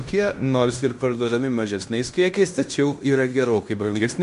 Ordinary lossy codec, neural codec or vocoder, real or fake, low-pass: MP3, 96 kbps; codec, 16 kHz in and 24 kHz out, 0.9 kbps, LongCat-Audio-Codec, fine tuned four codebook decoder; fake; 10.8 kHz